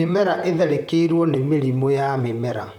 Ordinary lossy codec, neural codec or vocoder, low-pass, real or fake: none; vocoder, 44.1 kHz, 128 mel bands, Pupu-Vocoder; 19.8 kHz; fake